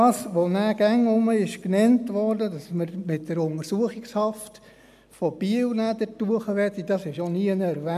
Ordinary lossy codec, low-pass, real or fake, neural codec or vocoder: MP3, 96 kbps; 14.4 kHz; real; none